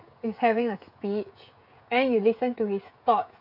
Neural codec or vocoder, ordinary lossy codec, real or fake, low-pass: codec, 16 kHz, 16 kbps, FreqCodec, smaller model; none; fake; 5.4 kHz